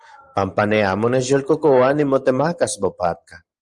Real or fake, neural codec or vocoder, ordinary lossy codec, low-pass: fake; vocoder, 44.1 kHz, 128 mel bands every 512 samples, BigVGAN v2; Opus, 32 kbps; 10.8 kHz